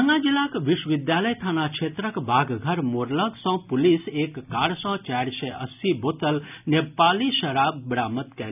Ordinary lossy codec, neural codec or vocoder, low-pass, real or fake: none; none; 3.6 kHz; real